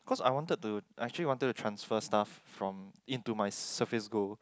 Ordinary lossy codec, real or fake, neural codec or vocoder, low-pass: none; real; none; none